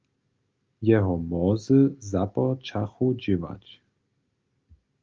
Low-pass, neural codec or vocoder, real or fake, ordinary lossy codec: 7.2 kHz; none; real; Opus, 32 kbps